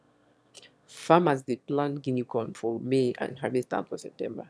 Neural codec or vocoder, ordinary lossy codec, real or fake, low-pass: autoencoder, 22.05 kHz, a latent of 192 numbers a frame, VITS, trained on one speaker; none; fake; none